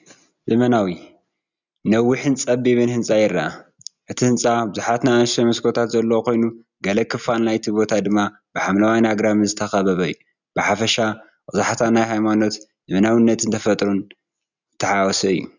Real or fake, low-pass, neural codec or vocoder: real; 7.2 kHz; none